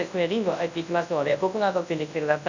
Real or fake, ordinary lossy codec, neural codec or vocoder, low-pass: fake; none; codec, 24 kHz, 0.9 kbps, WavTokenizer, large speech release; 7.2 kHz